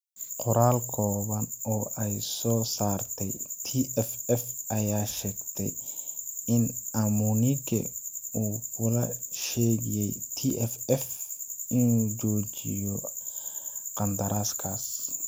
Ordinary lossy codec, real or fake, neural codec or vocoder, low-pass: none; real; none; none